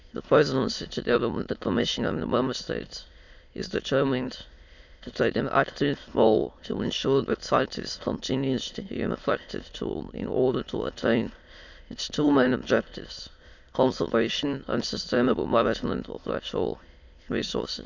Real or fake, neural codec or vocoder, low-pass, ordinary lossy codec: fake; autoencoder, 22.05 kHz, a latent of 192 numbers a frame, VITS, trained on many speakers; 7.2 kHz; none